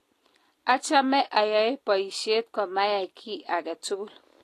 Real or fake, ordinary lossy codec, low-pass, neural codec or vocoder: real; AAC, 48 kbps; 14.4 kHz; none